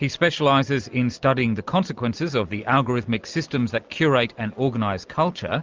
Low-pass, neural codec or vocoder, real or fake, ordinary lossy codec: 7.2 kHz; none; real; Opus, 32 kbps